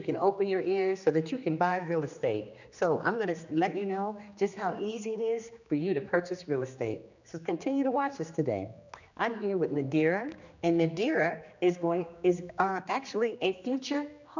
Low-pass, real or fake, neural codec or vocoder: 7.2 kHz; fake; codec, 16 kHz, 2 kbps, X-Codec, HuBERT features, trained on general audio